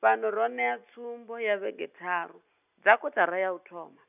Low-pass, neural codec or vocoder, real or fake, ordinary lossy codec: 3.6 kHz; none; real; none